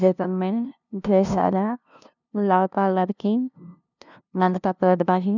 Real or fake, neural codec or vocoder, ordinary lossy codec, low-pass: fake; codec, 16 kHz, 0.5 kbps, FunCodec, trained on LibriTTS, 25 frames a second; none; 7.2 kHz